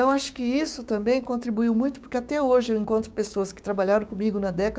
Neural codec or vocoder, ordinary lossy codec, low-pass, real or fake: codec, 16 kHz, 6 kbps, DAC; none; none; fake